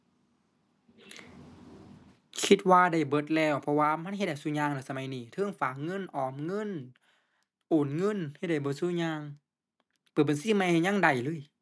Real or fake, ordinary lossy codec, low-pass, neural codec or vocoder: real; none; none; none